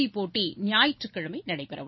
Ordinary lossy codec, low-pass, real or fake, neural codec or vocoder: MP3, 24 kbps; 7.2 kHz; real; none